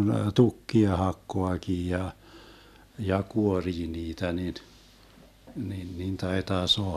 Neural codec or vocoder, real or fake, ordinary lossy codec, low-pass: none; real; none; 14.4 kHz